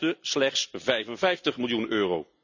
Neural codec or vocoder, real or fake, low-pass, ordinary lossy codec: none; real; 7.2 kHz; none